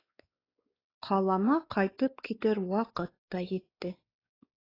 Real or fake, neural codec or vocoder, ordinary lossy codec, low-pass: fake; codec, 16 kHz, 4 kbps, X-Codec, WavLM features, trained on Multilingual LibriSpeech; AAC, 24 kbps; 5.4 kHz